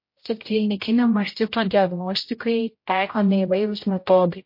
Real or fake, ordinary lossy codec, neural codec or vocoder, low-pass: fake; MP3, 32 kbps; codec, 16 kHz, 0.5 kbps, X-Codec, HuBERT features, trained on general audio; 5.4 kHz